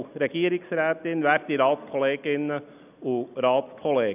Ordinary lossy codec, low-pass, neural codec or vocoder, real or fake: none; 3.6 kHz; none; real